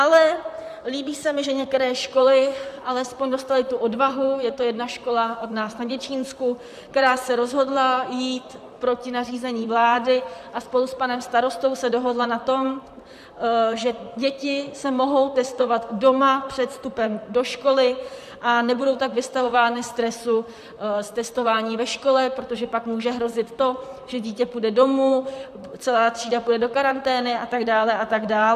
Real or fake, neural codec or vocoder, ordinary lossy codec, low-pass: fake; vocoder, 44.1 kHz, 128 mel bands, Pupu-Vocoder; AAC, 96 kbps; 14.4 kHz